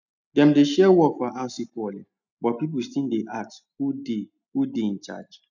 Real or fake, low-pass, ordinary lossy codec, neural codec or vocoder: real; 7.2 kHz; none; none